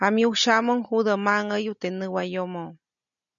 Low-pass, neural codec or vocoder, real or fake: 7.2 kHz; none; real